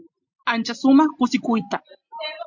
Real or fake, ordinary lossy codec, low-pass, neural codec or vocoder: real; MP3, 48 kbps; 7.2 kHz; none